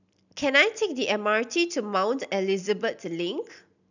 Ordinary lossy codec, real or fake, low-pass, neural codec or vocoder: none; real; 7.2 kHz; none